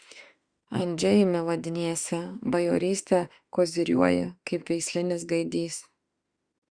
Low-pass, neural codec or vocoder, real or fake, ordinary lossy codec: 9.9 kHz; autoencoder, 48 kHz, 32 numbers a frame, DAC-VAE, trained on Japanese speech; fake; Opus, 64 kbps